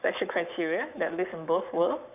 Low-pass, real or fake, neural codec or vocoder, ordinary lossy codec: 3.6 kHz; fake; codec, 44.1 kHz, 7.8 kbps, Pupu-Codec; none